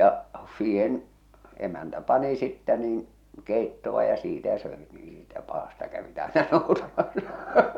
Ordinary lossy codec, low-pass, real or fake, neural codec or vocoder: none; 19.8 kHz; fake; vocoder, 44.1 kHz, 128 mel bands every 512 samples, BigVGAN v2